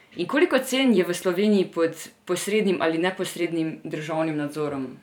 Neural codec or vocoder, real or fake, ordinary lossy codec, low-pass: vocoder, 44.1 kHz, 128 mel bands every 512 samples, BigVGAN v2; fake; none; 19.8 kHz